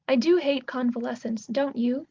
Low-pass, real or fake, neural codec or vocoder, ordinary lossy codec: 7.2 kHz; real; none; Opus, 32 kbps